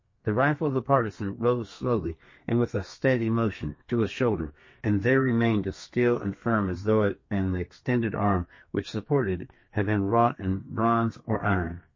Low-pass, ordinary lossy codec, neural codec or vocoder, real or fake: 7.2 kHz; MP3, 32 kbps; codec, 32 kHz, 1.9 kbps, SNAC; fake